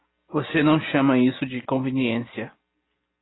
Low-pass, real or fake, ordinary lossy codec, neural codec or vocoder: 7.2 kHz; real; AAC, 16 kbps; none